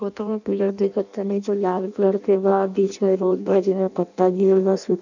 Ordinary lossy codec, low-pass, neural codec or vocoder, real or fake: none; 7.2 kHz; codec, 16 kHz in and 24 kHz out, 0.6 kbps, FireRedTTS-2 codec; fake